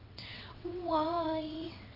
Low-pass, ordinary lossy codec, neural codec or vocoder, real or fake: 5.4 kHz; AAC, 24 kbps; vocoder, 22.05 kHz, 80 mel bands, WaveNeXt; fake